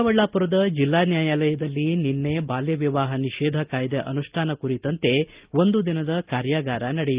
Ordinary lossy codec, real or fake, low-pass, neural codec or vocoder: Opus, 32 kbps; real; 3.6 kHz; none